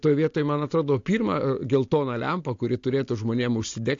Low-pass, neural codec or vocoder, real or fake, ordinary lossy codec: 7.2 kHz; none; real; AAC, 48 kbps